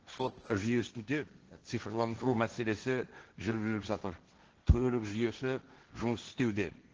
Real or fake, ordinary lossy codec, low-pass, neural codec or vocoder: fake; Opus, 16 kbps; 7.2 kHz; codec, 16 kHz, 1.1 kbps, Voila-Tokenizer